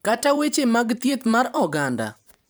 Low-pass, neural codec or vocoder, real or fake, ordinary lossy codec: none; vocoder, 44.1 kHz, 128 mel bands every 512 samples, BigVGAN v2; fake; none